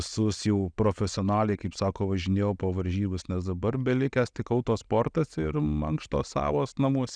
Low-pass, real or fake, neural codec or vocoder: 9.9 kHz; real; none